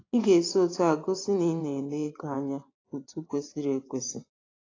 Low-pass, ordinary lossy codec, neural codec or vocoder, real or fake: 7.2 kHz; AAC, 32 kbps; vocoder, 24 kHz, 100 mel bands, Vocos; fake